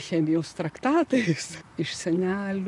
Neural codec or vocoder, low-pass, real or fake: vocoder, 44.1 kHz, 128 mel bands, Pupu-Vocoder; 10.8 kHz; fake